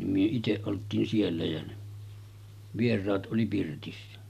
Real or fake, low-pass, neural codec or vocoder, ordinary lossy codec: real; 14.4 kHz; none; none